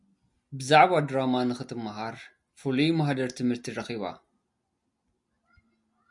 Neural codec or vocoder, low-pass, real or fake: none; 10.8 kHz; real